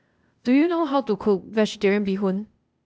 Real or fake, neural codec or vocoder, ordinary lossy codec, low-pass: fake; codec, 16 kHz, 0.8 kbps, ZipCodec; none; none